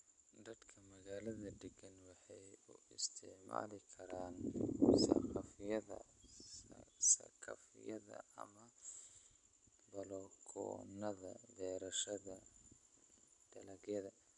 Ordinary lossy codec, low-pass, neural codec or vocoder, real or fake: none; 10.8 kHz; none; real